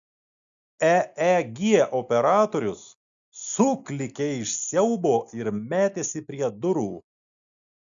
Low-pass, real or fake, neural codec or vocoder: 7.2 kHz; real; none